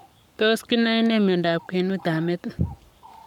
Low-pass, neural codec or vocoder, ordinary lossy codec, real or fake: 19.8 kHz; codec, 44.1 kHz, 7.8 kbps, Pupu-Codec; none; fake